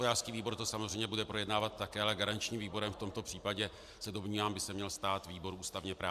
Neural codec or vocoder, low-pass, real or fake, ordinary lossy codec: none; 14.4 kHz; real; MP3, 96 kbps